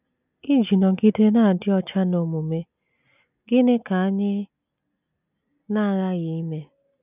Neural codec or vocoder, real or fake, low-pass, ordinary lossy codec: none; real; 3.6 kHz; none